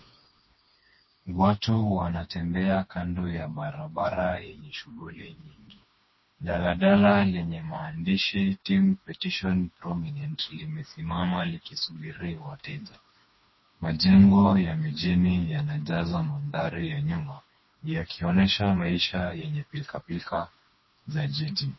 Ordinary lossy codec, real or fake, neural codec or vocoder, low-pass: MP3, 24 kbps; fake; codec, 16 kHz, 2 kbps, FreqCodec, smaller model; 7.2 kHz